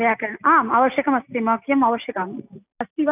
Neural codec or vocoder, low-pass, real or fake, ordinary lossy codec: none; 3.6 kHz; real; none